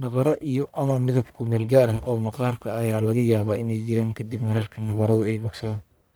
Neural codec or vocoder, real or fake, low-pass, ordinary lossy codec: codec, 44.1 kHz, 1.7 kbps, Pupu-Codec; fake; none; none